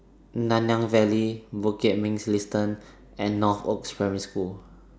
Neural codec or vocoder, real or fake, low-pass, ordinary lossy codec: none; real; none; none